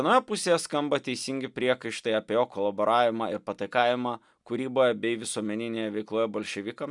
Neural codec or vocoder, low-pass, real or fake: none; 10.8 kHz; real